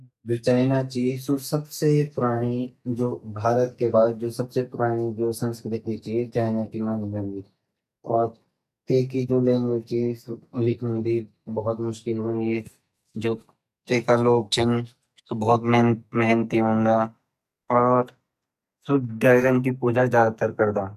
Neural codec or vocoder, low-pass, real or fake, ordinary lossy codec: codec, 44.1 kHz, 2.6 kbps, SNAC; 14.4 kHz; fake; none